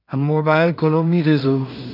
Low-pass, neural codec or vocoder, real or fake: 5.4 kHz; codec, 16 kHz in and 24 kHz out, 0.4 kbps, LongCat-Audio-Codec, two codebook decoder; fake